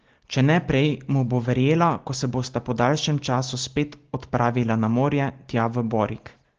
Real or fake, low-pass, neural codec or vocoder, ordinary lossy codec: real; 7.2 kHz; none; Opus, 16 kbps